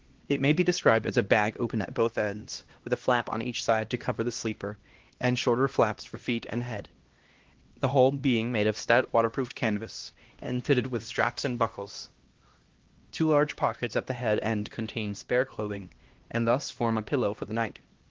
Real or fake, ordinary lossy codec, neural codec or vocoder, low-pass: fake; Opus, 16 kbps; codec, 16 kHz, 1 kbps, X-Codec, HuBERT features, trained on LibriSpeech; 7.2 kHz